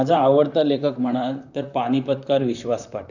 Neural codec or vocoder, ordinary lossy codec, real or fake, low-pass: vocoder, 44.1 kHz, 128 mel bands, Pupu-Vocoder; none; fake; 7.2 kHz